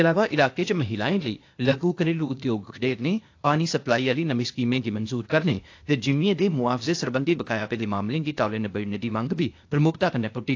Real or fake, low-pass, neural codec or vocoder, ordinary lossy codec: fake; 7.2 kHz; codec, 16 kHz, 0.8 kbps, ZipCodec; AAC, 48 kbps